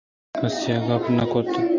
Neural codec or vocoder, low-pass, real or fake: none; 7.2 kHz; real